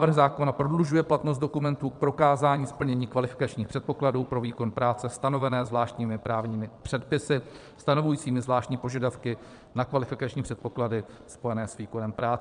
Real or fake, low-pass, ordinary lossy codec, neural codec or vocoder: fake; 9.9 kHz; MP3, 96 kbps; vocoder, 22.05 kHz, 80 mel bands, Vocos